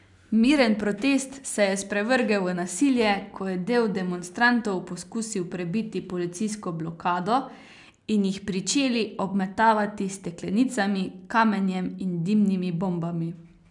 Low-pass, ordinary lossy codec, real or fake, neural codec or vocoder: 10.8 kHz; none; real; none